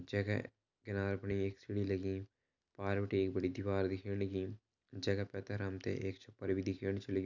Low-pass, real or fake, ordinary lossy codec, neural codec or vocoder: 7.2 kHz; real; none; none